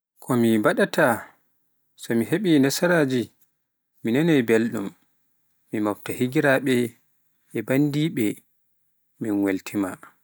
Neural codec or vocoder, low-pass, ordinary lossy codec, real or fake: none; none; none; real